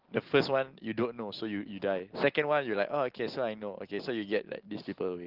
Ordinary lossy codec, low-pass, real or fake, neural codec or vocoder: Opus, 16 kbps; 5.4 kHz; real; none